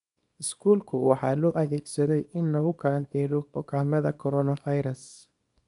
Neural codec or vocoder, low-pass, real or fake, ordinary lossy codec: codec, 24 kHz, 0.9 kbps, WavTokenizer, small release; 10.8 kHz; fake; none